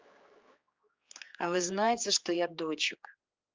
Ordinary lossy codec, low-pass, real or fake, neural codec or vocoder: Opus, 32 kbps; 7.2 kHz; fake; codec, 16 kHz, 4 kbps, X-Codec, HuBERT features, trained on general audio